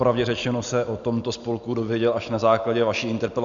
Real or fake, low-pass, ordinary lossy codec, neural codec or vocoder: real; 7.2 kHz; Opus, 64 kbps; none